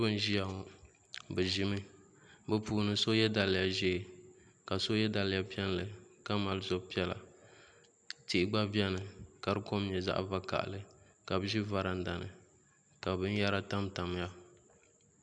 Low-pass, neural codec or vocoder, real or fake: 9.9 kHz; none; real